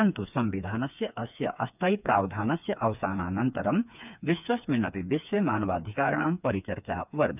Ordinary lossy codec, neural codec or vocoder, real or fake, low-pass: none; codec, 16 kHz, 4 kbps, FreqCodec, smaller model; fake; 3.6 kHz